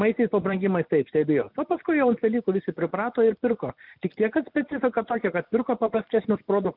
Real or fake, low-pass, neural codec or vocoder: real; 5.4 kHz; none